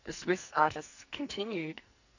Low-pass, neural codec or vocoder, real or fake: 7.2 kHz; codec, 44.1 kHz, 2.6 kbps, SNAC; fake